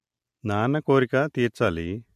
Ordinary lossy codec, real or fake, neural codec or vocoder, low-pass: MP3, 64 kbps; real; none; 14.4 kHz